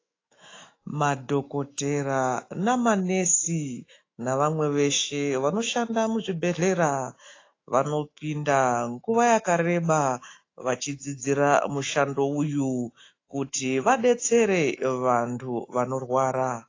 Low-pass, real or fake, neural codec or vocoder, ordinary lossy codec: 7.2 kHz; fake; autoencoder, 48 kHz, 128 numbers a frame, DAC-VAE, trained on Japanese speech; AAC, 32 kbps